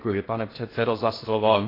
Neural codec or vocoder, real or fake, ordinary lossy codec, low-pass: codec, 16 kHz in and 24 kHz out, 0.6 kbps, FocalCodec, streaming, 4096 codes; fake; AAC, 24 kbps; 5.4 kHz